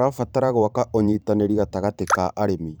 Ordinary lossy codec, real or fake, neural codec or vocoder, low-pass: none; fake; vocoder, 44.1 kHz, 128 mel bands every 512 samples, BigVGAN v2; none